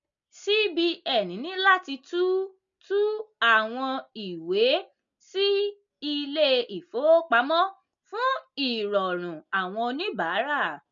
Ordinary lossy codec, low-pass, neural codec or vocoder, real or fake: MP3, 64 kbps; 7.2 kHz; none; real